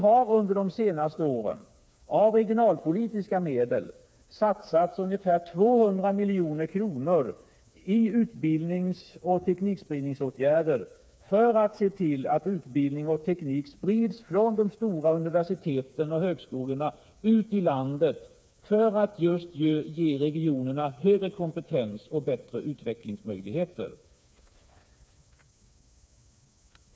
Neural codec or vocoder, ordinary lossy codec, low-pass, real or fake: codec, 16 kHz, 4 kbps, FreqCodec, smaller model; none; none; fake